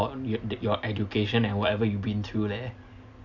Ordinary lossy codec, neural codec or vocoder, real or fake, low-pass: none; none; real; 7.2 kHz